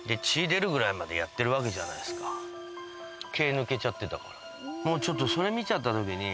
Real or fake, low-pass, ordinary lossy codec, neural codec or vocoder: real; none; none; none